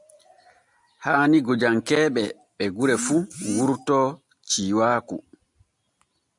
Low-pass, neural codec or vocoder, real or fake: 10.8 kHz; none; real